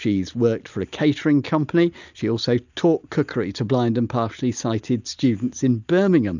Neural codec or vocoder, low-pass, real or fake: none; 7.2 kHz; real